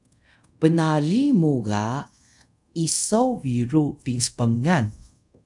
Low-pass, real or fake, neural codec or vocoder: 10.8 kHz; fake; codec, 24 kHz, 0.5 kbps, DualCodec